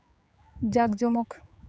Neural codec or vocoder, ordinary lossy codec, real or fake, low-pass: codec, 16 kHz, 4 kbps, X-Codec, HuBERT features, trained on general audio; none; fake; none